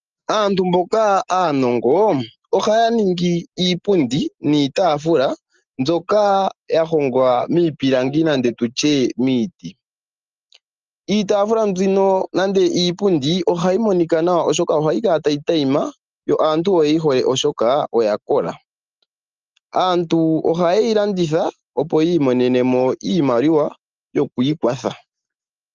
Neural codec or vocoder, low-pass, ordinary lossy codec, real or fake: none; 7.2 kHz; Opus, 24 kbps; real